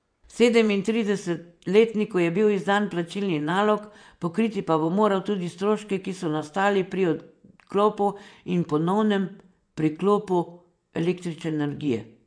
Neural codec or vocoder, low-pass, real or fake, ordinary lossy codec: vocoder, 24 kHz, 100 mel bands, Vocos; 9.9 kHz; fake; none